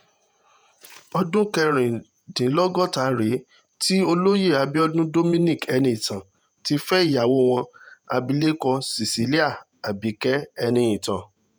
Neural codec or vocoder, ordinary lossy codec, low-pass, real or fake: vocoder, 48 kHz, 128 mel bands, Vocos; none; none; fake